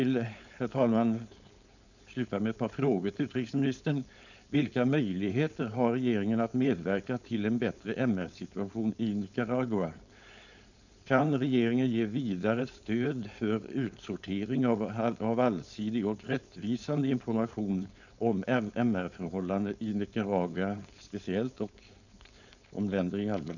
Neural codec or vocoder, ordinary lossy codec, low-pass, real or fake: codec, 16 kHz, 4.8 kbps, FACodec; none; 7.2 kHz; fake